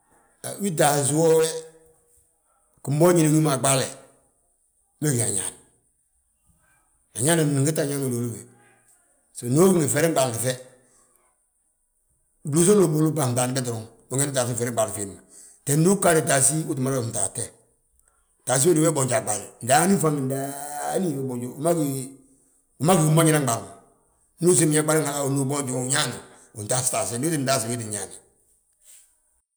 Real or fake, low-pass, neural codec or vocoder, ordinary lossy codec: real; none; none; none